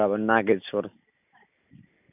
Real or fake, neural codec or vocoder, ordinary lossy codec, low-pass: real; none; none; 3.6 kHz